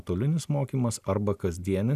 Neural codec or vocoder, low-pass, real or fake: none; 14.4 kHz; real